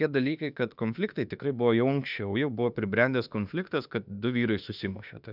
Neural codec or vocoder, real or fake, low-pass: autoencoder, 48 kHz, 32 numbers a frame, DAC-VAE, trained on Japanese speech; fake; 5.4 kHz